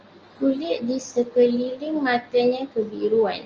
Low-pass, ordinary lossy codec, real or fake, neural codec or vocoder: 7.2 kHz; Opus, 24 kbps; real; none